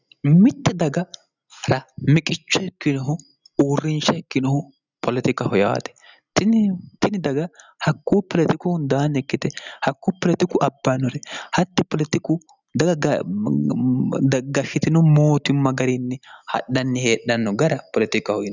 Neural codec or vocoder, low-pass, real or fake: none; 7.2 kHz; real